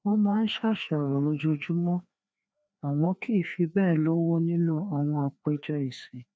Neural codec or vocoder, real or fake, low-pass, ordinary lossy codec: codec, 16 kHz, 2 kbps, FreqCodec, larger model; fake; none; none